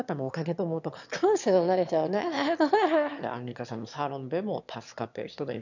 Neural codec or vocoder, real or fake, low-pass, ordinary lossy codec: autoencoder, 22.05 kHz, a latent of 192 numbers a frame, VITS, trained on one speaker; fake; 7.2 kHz; none